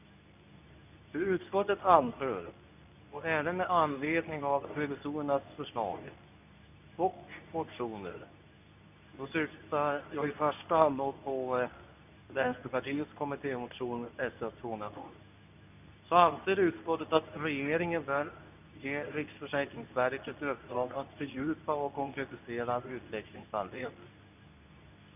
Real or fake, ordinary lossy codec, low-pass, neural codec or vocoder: fake; none; 3.6 kHz; codec, 24 kHz, 0.9 kbps, WavTokenizer, medium speech release version 2